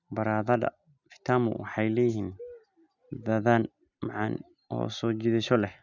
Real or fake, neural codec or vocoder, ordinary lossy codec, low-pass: real; none; none; 7.2 kHz